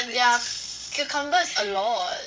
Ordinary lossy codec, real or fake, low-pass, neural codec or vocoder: none; fake; none; codec, 16 kHz, 16 kbps, FreqCodec, larger model